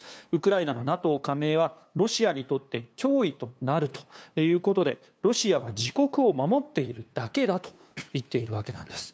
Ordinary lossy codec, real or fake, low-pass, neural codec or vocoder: none; fake; none; codec, 16 kHz, 2 kbps, FunCodec, trained on LibriTTS, 25 frames a second